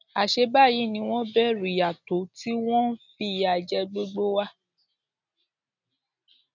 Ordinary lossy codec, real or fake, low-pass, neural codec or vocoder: none; real; 7.2 kHz; none